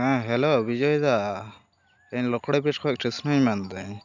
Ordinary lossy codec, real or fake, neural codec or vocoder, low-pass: none; real; none; 7.2 kHz